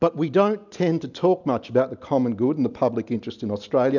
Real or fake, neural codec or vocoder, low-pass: real; none; 7.2 kHz